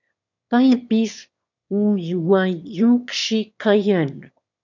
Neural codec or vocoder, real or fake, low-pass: autoencoder, 22.05 kHz, a latent of 192 numbers a frame, VITS, trained on one speaker; fake; 7.2 kHz